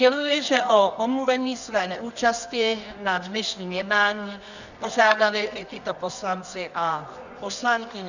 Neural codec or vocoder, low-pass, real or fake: codec, 24 kHz, 0.9 kbps, WavTokenizer, medium music audio release; 7.2 kHz; fake